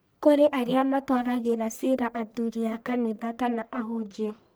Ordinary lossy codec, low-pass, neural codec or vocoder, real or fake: none; none; codec, 44.1 kHz, 1.7 kbps, Pupu-Codec; fake